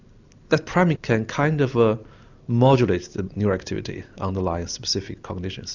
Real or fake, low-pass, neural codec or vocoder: real; 7.2 kHz; none